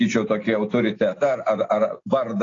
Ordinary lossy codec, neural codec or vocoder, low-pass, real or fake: AAC, 32 kbps; none; 7.2 kHz; real